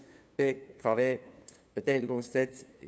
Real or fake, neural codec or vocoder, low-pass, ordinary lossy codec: fake; codec, 16 kHz, 2 kbps, FunCodec, trained on LibriTTS, 25 frames a second; none; none